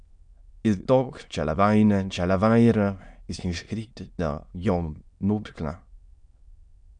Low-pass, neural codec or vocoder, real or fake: 9.9 kHz; autoencoder, 22.05 kHz, a latent of 192 numbers a frame, VITS, trained on many speakers; fake